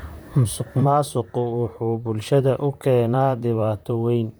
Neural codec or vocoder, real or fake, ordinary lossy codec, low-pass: vocoder, 44.1 kHz, 128 mel bands, Pupu-Vocoder; fake; none; none